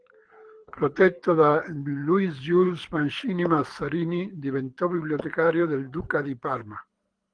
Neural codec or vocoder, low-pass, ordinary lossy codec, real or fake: codec, 24 kHz, 6 kbps, HILCodec; 9.9 kHz; Opus, 24 kbps; fake